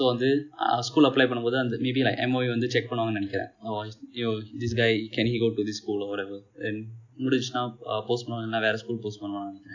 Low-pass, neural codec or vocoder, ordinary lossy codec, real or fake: 7.2 kHz; none; none; real